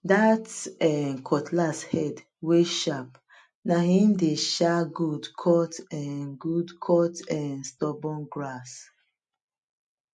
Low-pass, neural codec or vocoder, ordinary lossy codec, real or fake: 10.8 kHz; none; MP3, 48 kbps; real